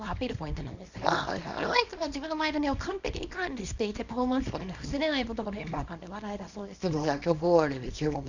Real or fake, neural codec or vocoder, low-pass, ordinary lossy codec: fake; codec, 24 kHz, 0.9 kbps, WavTokenizer, small release; 7.2 kHz; Opus, 64 kbps